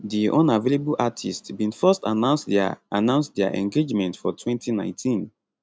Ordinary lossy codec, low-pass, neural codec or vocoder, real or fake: none; none; none; real